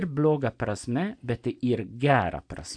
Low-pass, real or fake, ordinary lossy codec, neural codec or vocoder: 9.9 kHz; real; MP3, 96 kbps; none